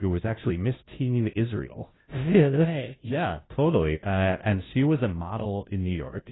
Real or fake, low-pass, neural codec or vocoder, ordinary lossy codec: fake; 7.2 kHz; codec, 16 kHz, 0.5 kbps, FunCodec, trained on Chinese and English, 25 frames a second; AAC, 16 kbps